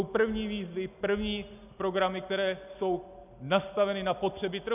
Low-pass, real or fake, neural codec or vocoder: 3.6 kHz; real; none